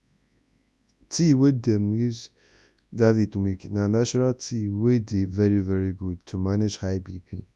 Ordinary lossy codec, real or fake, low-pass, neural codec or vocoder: none; fake; none; codec, 24 kHz, 0.9 kbps, WavTokenizer, large speech release